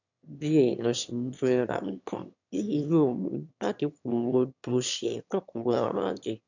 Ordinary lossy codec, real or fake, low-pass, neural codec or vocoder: none; fake; 7.2 kHz; autoencoder, 22.05 kHz, a latent of 192 numbers a frame, VITS, trained on one speaker